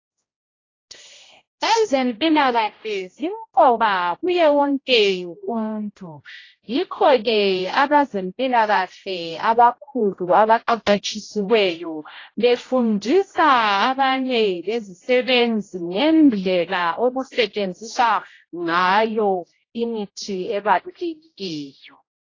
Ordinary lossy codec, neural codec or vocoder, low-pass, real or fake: AAC, 32 kbps; codec, 16 kHz, 0.5 kbps, X-Codec, HuBERT features, trained on general audio; 7.2 kHz; fake